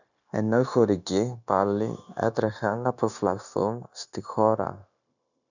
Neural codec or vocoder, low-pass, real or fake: codec, 16 kHz, 0.9 kbps, LongCat-Audio-Codec; 7.2 kHz; fake